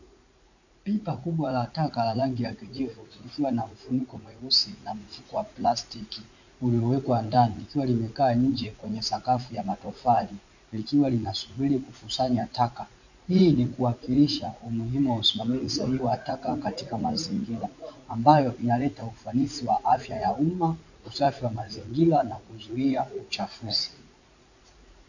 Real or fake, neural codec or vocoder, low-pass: fake; vocoder, 44.1 kHz, 80 mel bands, Vocos; 7.2 kHz